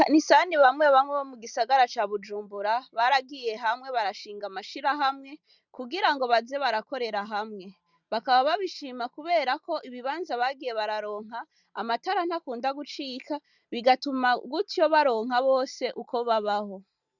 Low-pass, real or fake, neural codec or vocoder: 7.2 kHz; real; none